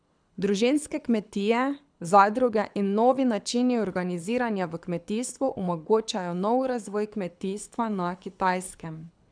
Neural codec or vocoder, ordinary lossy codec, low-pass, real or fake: codec, 24 kHz, 6 kbps, HILCodec; none; 9.9 kHz; fake